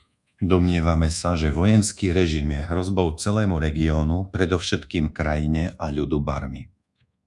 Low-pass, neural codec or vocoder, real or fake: 10.8 kHz; codec, 24 kHz, 1.2 kbps, DualCodec; fake